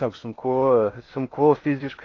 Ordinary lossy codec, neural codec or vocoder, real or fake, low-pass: AAC, 32 kbps; codec, 16 kHz in and 24 kHz out, 0.6 kbps, FocalCodec, streaming, 4096 codes; fake; 7.2 kHz